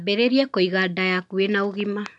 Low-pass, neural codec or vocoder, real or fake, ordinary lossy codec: 10.8 kHz; none; real; none